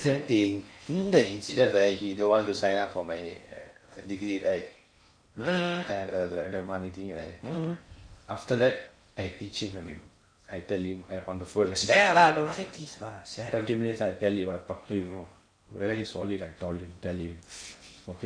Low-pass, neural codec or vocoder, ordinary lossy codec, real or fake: 9.9 kHz; codec, 16 kHz in and 24 kHz out, 0.6 kbps, FocalCodec, streaming, 4096 codes; MP3, 48 kbps; fake